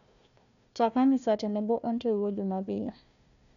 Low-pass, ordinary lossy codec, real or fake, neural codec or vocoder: 7.2 kHz; none; fake; codec, 16 kHz, 1 kbps, FunCodec, trained on Chinese and English, 50 frames a second